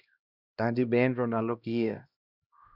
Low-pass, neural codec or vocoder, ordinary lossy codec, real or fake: 5.4 kHz; codec, 16 kHz, 1 kbps, X-Codec, HuBERT features, trained on LibriSpeech; Opus, 64 kbps; fake